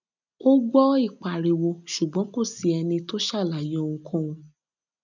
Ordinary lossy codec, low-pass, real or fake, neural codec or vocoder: none; 7.2 kHz; real; none